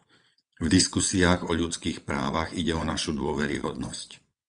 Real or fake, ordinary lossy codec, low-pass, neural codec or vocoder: fake; Opus, 64 kbps; 9.9 kHz; vocoder, 22.05 kHz, 80 mel bands, WaveNeXt